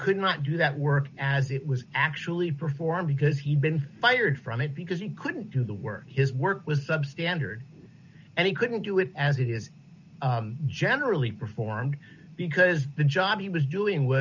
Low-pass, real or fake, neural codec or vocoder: 7.2 kHz; real; none